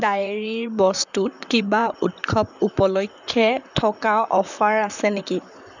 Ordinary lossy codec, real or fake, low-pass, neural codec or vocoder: none; fake; 7.2 kHz; vocoder, 44.1 kHz, 128 mel bands, Pupu-Vocoder